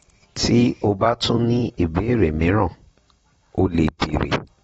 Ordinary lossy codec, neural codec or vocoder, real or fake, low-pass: AAC, 24 kbps; vocoder, 44.1 kHz, 128 mel bands every 256 samples, BigVGAN v2; fake; 19.8 kHz